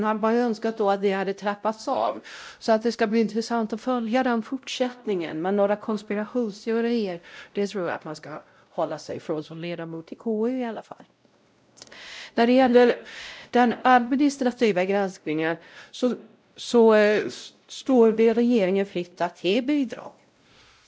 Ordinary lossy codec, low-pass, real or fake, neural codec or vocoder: none; none; fake; codec, 16 kHz, 0.5 kbps, X-Codec, WavLM features, trained on Multilingual LibriSpeech